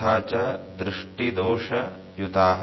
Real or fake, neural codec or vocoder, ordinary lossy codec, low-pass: fake; vocoder, 24 kHz, 100 mel bands, Vocos; MP3, 24 kbps; 7.2 kHz